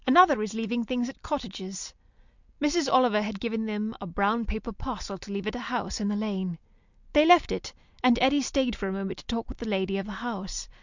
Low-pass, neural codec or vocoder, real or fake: 7.2 kHz; none; real